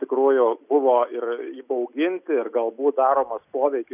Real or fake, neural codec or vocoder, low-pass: real; none; 3.6 kHz